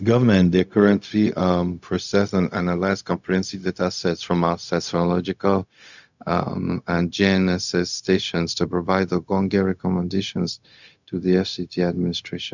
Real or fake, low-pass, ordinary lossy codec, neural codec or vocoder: fake; 7.2 kHz; none; codec, 16 kHz, 0.4 kbps, LongCat-Audio-Codec